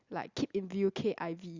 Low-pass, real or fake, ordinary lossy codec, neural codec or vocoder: 7.2 kHz; real; Opus, 64 kbps; none